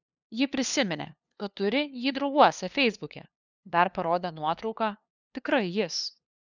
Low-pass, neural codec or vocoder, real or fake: 7.2 kHz; codec, 16 kHz, 2 kbps, FunCodec, trained on LibriTTS, 25 frames a second; fake